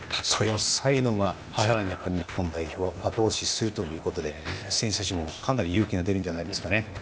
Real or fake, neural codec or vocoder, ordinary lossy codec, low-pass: fake; codec, 16 kHz, 0.8 kbps, ZipCodec; none; none